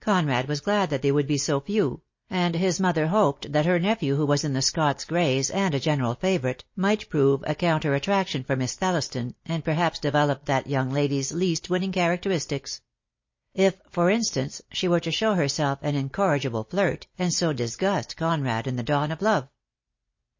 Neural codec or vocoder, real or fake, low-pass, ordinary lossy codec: none; real; 7.2 kHz; MP3, 32 kbps